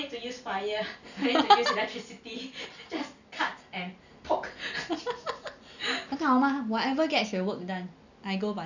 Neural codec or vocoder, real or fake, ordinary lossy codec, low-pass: none; real; none; 7.2 kHz